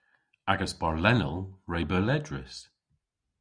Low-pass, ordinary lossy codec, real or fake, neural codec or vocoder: 9.9 kHz; Opus, 64 kbps; real; none